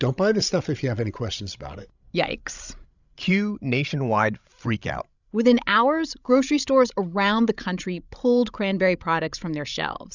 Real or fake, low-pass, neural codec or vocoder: fake; 7.2 kHz; codec, 16 kHz, 16 kbps, FreqCodec, larger model